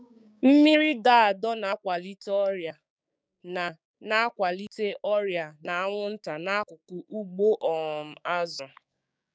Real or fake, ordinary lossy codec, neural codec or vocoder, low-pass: fake; none; codec, 16 kHz, 6 kbps, DAC; none